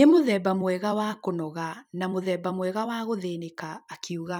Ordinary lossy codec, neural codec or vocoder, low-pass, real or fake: none; vocoder, 44.1 kHz, 128 mel bands every 256 samples, BigVGAN v2; none; fake